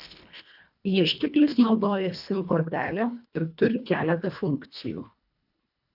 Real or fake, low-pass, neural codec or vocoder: fake; 5.4 kHz; codec, 24 kHz, 1.5 kbps, HILCodec